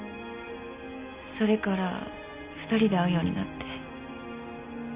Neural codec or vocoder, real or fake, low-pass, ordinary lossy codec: none; real; 3.6 kHz; Opus, 64 kbps